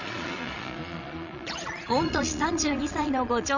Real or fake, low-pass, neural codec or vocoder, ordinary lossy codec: fake; 7.2 kHz; vocoder, 22.05 kHz, 80 mel bands, Vocos; Opus, 64 kbps